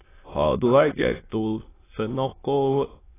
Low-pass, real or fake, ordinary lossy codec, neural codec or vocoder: 3.6 kHz; fake; AAC, 16 kbps; autoencoder, 22.05 kHz, a latent of 192 numbers a frame, VITS, trained on many speakers